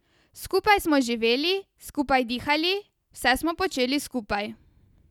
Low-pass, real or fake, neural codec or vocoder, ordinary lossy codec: 19.8 kHz; real; none; none